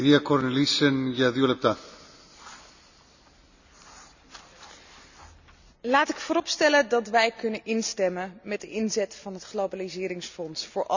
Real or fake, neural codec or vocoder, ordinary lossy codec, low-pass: real; none; none; 7.2 kHz